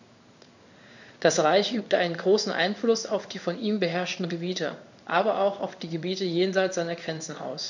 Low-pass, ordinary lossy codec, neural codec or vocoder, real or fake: 7.2 kHz; none; codec, 16 kHz in and 24 kHz out, 1 kbps, XY-Tokenizer; fake